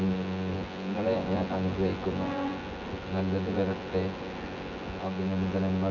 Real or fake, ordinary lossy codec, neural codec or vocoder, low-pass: fake; none; vocoder, 24 kHz, 100 mel bands, Vocos; 7.2 kHz